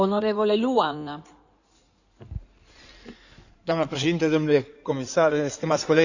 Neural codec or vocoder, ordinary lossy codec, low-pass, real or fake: codec, 16 kHz in and 24 kHz out, 2.2 kbps, FireRedTTS-2 codec; none; 7.2 kHz; fake